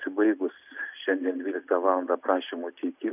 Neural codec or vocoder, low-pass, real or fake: none; 3.6 kHz; real